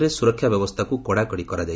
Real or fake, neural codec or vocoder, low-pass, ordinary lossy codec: real; none; none; none